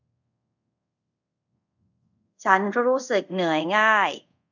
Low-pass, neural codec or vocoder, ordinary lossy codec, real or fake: 7.2 kHz; codec, 24 kHz, 0.5 kbps, DualCodec; none; fake